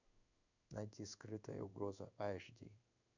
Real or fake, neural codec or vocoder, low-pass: fake; codec, 16 kHz in and 24 kHz out, 1 kbps, XY-Tokenizer; 7.2 kHz